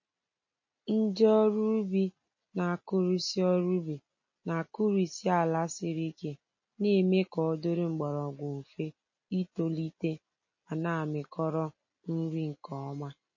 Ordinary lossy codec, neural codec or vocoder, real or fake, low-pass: MP3, 32 kbps; none; real; 7.2 kHz